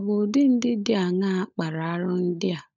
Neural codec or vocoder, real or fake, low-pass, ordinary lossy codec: codec, 16 kHz, 16 kbps, FunCodec, trained on LibriTTS, 50 frames a second; fake; 7.2 kHz; none